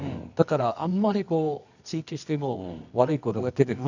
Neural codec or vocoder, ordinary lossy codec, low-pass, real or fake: codec, 24 kHz, 0.9 kbps, WavTokenizer, medium music audio release; none; 7.2 kHz; fake